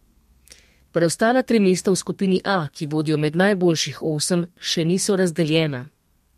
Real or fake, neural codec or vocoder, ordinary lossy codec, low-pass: fake; codec, 32 kHz, 1.9 kbps, SNAC; MP3, 64 kbps; 14.4 kHz